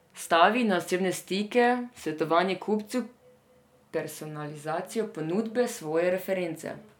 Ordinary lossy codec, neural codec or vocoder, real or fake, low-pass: none; none; real; 19.8 kHz